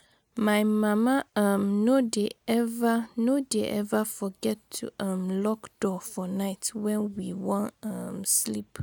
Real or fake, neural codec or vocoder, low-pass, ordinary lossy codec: real; none; none; none